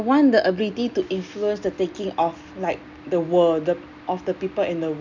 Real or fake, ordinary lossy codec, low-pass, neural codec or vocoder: real; none; 7.2 kHz; none